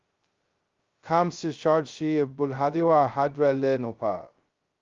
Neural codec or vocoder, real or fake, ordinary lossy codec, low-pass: codec, 16 kHz, 0.2 kbps, FocalCodec; fake; Opus, 32 kbps; 7.2 kHz